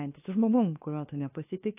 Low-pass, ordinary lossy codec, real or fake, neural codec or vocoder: 3.6 kHz; AAC, 32 kbps; fake; codec, 16 kHz, 0.7 kbps, FocalCodec